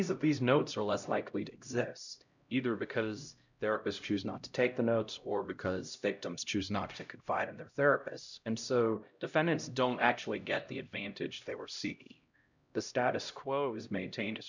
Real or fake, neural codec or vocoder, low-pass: fake; codec, 16 kHz, 0.5 kbps, X-Codec, HuBERT features, trained on LibriSpeech; 7.2 kHz